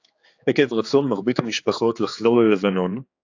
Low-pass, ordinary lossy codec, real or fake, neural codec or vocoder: 7.2 kHz; AAC, 48 kbps; fake; codec, 16 kHz, 4 kbps, X-Codec, HuBERT features, trained on general audio